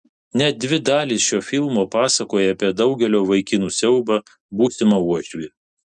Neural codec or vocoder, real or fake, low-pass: none; real; 10.8 kHz